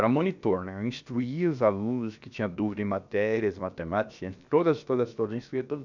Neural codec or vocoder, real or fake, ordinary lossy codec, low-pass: codec, 16 kHz, about 1 kbps, DyCAST, with the encoder's durations; fake; none; 7.2 kHz